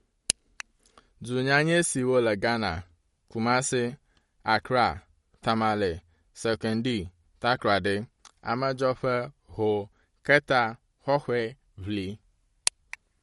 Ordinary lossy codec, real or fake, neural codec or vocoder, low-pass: MP3, 48 kbps; real; none; 19.8 kHz